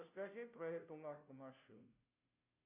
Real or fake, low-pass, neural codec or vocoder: fake; 3.6 kHz; codec, 16 kHz, 0.5 kbps, FunCodec, trained on Chinese and English, 25 frames a second